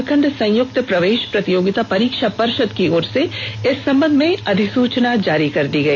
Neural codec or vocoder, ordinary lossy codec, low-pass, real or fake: none; MP3, 64 kbps; 7.2 kHz; real